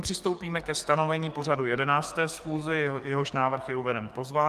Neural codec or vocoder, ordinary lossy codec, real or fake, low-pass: codec, 44.1 kHz, 2.6 kbps, SNAC; Opus, 32 kbps; fake; 14.4 kHz